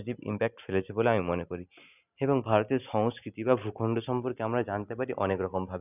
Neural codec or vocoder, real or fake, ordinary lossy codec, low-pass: none; real; none; 3.6 kHz